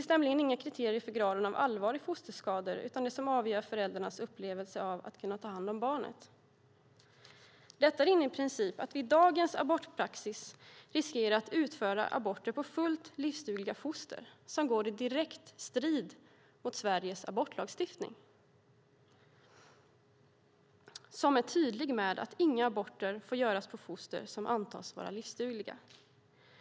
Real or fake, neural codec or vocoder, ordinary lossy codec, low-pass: real; none; none; none